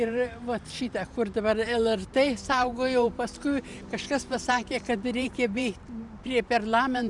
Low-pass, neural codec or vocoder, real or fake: 10.8 kHz; vocoder, 44.1 kHz, 128 mel bands every 512 samples, BigVGAN v2; fake